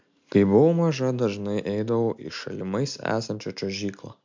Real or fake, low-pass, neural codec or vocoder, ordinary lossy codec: real; 7.2 kHz; none; MP3, 64 kbps